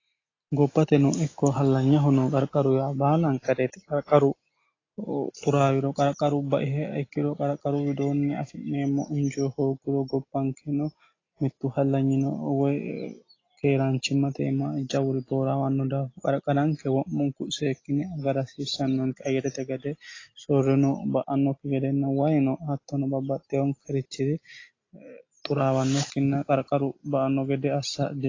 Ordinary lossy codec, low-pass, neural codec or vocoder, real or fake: AAC, 32 kbps; 7.2 kHz; none; real